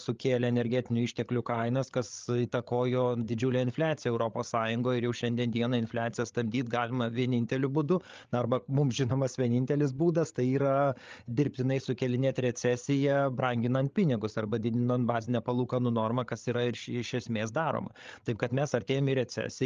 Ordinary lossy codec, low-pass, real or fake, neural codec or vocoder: Opus, 24 kbps; 7.2 kHz; fake; codec, 16 kHz, 8 kbps, FreqCodec, larger model